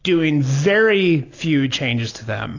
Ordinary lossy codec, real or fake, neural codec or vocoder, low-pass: AAC, 32 kbps; real; none; 7.2 kHz